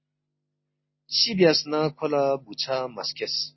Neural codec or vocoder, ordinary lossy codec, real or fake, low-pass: none; MP3, 24 kbps; real; 7.2 kHz